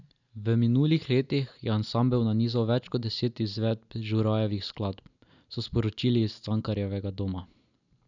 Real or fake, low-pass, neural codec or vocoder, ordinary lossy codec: real; 7.2 kHz; none; none